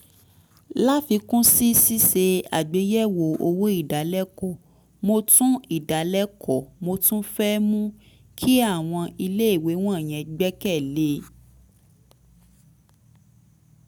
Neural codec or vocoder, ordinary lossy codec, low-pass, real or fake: none; none; none; real